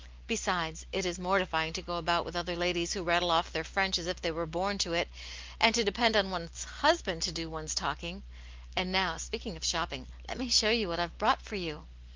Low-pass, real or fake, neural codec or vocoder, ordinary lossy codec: 7.2 kHz; real; none; Opus, 24 kbps